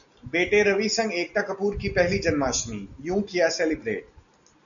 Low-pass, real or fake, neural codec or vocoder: 7.2 kHz; real; none